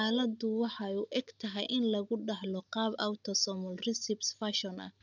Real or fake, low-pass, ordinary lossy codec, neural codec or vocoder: real; 7.2 kHz; none; none